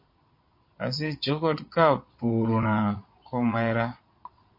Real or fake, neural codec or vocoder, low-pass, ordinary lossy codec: fake; vocoder, 22.05 kHz, 80 mel bands, Vocos; 5.4 kHz; MP3, 32 kbps